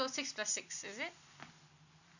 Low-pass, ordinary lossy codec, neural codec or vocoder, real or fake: 7.2 kHz; none; none; real